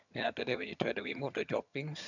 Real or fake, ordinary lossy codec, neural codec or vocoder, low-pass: fake; none; vocoder, 22.05 kHz, 80 mel bands, HiFi-GAN; 7.2 kHz